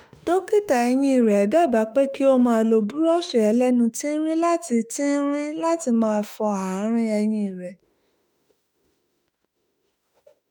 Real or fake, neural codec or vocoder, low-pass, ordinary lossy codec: fake; autoencoder, 48 kHz, 32 numbers a frame, DAC-VAE, trained on Japanese speech; none; none